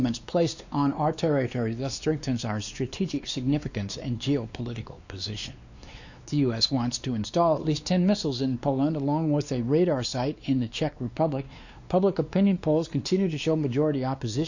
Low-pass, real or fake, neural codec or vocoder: 7.2 kHz; fake; codec, 16 kHz, 2 kbps, X-Codec, WavLM features, trained on Multilingual LibriSpeech